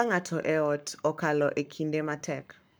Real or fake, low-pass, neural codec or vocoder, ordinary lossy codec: fake; none; codec, 44.1 kHz, 7.8 kbps, Pupu-Codec; none